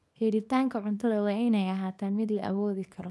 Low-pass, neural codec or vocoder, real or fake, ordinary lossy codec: none; codec, 24 kHz, 0.9 kbps, WavTokenizer, small release; fake; none